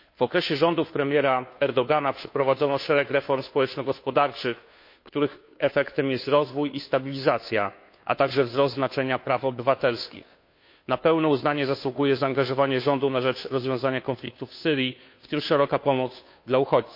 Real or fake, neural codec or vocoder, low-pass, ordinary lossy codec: fake; codec, 16 kHz, 2 kbps, FunCodec, trained on Chinese and English, 25 frames a second; 5.4 kHz; MP3, 32 kbps